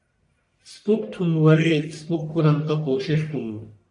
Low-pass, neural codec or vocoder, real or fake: 10.8 kHz; codec, 44.1 kHz, 1.7 kbps, Pupu-Codec; fake